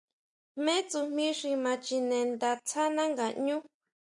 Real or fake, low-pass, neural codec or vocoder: real; 10.8 kHz; none